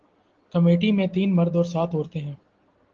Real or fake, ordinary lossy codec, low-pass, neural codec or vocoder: real; Opus, 16 kbps; 7.2 kHz; none